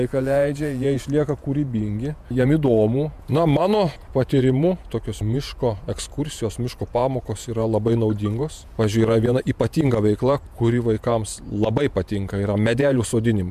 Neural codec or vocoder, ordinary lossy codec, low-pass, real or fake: vocoder, 48 kHz, 128 mel bands, Vocos; MP3, 96 kbps; 14.4 kHz; fake